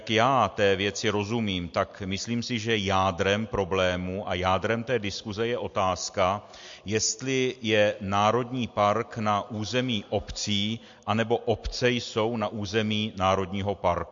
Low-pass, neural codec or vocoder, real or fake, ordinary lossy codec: 7.2 kHz; none; real; MP3, 48 kbps